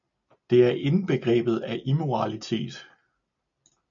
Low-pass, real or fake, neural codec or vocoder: 7.2 kHz; real; none